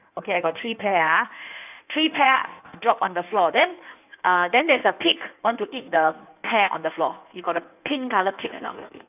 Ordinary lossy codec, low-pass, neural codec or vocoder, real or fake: none; 3.6 kHz; codec, 16 kHz in and 24 kHz out, 1.1 kbps, FireRedTTS-2 codec; fake